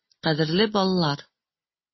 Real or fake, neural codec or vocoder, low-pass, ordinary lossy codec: real; none; 7.2 kHz; MP3, 24 kbps